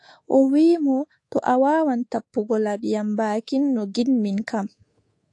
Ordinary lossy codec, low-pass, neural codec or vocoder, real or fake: AAC, 48 kbps; 10.8 kHz; codec, 24 kHz, 3.1 kbps, DualCodec; fake